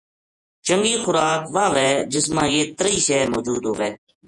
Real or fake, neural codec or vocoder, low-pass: fake; vocoder, 48 kHz, 128 mel bands, Vocos; 10.8 kHz